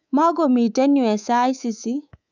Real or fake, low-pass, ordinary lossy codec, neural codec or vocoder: fake; 7.2 kHz; none; codec, 24 kHz, 3.1 kbps, DualCodec